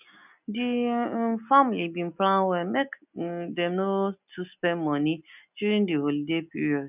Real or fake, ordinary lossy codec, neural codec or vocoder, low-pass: real; none; none; 3.6 kHz